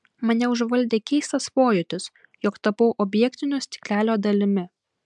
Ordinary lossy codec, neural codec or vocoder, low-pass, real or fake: MP3, 96 kbps; none; 10.8 kHz; real